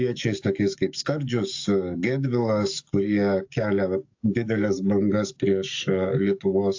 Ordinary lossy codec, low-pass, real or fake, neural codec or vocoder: AAC, 48 kbps; 7.2 kHz; fake; codec, 44.1 kHz, 7.8 kbps, DAC